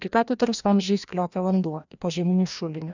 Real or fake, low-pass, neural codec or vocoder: fake; 7.2 kHz; codec, 16 kHz, 1 kbps, FreqCodec, larger model